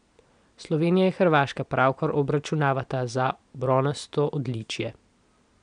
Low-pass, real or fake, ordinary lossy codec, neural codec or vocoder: 9.9 kHz; real; none; none